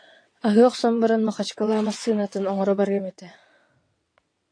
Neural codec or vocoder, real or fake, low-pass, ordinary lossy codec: vocoder, 22.05 kHz, 80 mel bands, WaveNeXt; fake; 9.9 kHz; AAC, 48 kbps